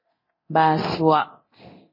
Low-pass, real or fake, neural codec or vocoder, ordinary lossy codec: 5.4 kHz; fake; codec, 24 kHz, 0.9 kbps, DualCodec; MP3, 24 kbps